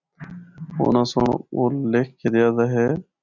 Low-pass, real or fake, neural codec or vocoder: 7.2 kHz; real; none